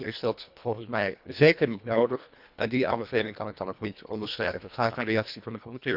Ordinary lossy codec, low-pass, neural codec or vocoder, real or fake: none; 5.4 kHz; codec, 24 kHz, 1.5 kbps, HILCodec; fake